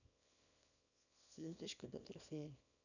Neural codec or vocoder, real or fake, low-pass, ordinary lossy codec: codec, 24 kHz, 0.9 kbps, WavTokenizer, small release; fake; 7.2 kHz; none